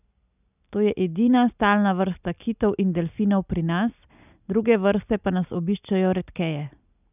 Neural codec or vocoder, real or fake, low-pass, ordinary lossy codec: none; real; 3.6 kHz; none